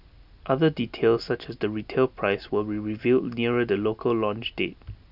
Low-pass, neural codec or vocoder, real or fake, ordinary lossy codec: 5.4 kHz; none; real; none